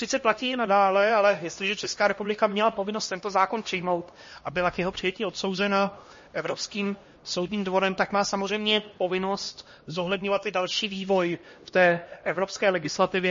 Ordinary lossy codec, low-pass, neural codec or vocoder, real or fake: MP3, 32 kbps; 7.2 kHz; codec, 16 kHz, 1 kbps, X-Codec, HuBERT features, trained on LibriSpeech; fake